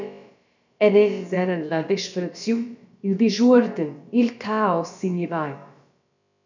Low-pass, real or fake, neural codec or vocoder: 7.2 kHz; fake; codec, 16 kHz, about 1 kbps, DyCAST, with the encoder's durations